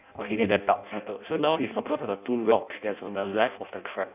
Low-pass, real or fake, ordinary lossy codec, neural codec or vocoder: 3.6 kHz; fake; none; codec, 16 kHz in and 24 kHz out, 0.6 kbps, FireRedTTS-2 codec